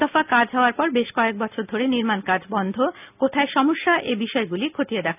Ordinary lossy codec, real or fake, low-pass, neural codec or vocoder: none; real; 3.6 kHz; none